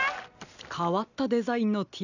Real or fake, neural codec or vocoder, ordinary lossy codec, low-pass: real; none; none; 7.2 kHz